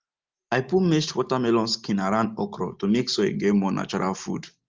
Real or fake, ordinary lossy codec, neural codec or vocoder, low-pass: real; Opus, 32 kbps; none; 7.2 kHz